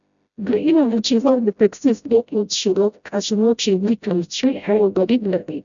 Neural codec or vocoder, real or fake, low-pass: codec, 16 kHz, 0.5 kbps, FreqCodec, smaller model; fake; 7.2 kHz